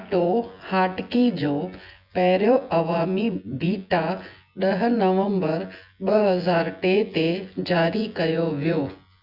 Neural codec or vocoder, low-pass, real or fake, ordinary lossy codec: vocoder, 24 kHz, 100 mel bands, Vocos; 5.4 kHz; fake; Opus, 64 kbps